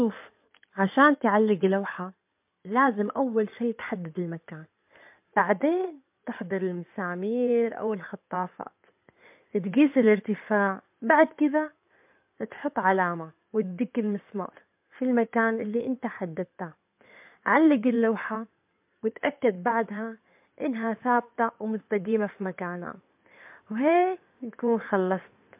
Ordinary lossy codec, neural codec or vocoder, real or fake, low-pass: MP3, 32 kbps; codec, 16 kHz in and 24 kHz out, 2.2 kbps, FireRedTTS-2 codec; fake; 3.6 kHz